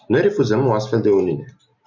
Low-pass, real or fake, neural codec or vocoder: 7.2 kHz; real; none